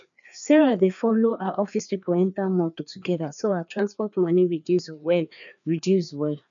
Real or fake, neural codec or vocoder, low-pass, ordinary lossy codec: fake; codec, 16 kHz, 2 kbps, FreqCodec, larger model; 7.2 kHz; none